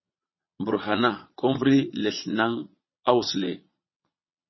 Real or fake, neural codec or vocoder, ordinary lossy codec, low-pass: fake; vocoder, 22.05 kHz, 80 mel bands, Vocos; MP3, 24 kbps; 7.2 kHz